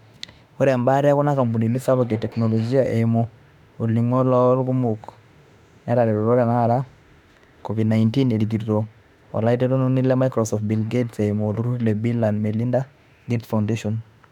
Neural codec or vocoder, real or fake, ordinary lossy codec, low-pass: autoencoder, 48 kHz, 32 numbers a frame, DAC-VAE, trained on Japanese speech; fake; none; 19.8 kHz